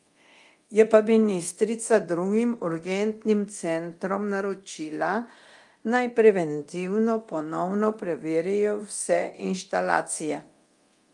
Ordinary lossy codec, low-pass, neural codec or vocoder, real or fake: Opus, 32 kbps; 10.8 kHz; codec, 24 kHz, 0.9 kbps, DualCodec; fake